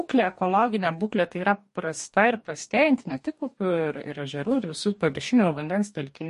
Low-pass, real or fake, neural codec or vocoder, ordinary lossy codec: 14.4 kHz; fake; codec, 44.1 kHz, 2.6 kbps, DAC; MP3, 48 kbps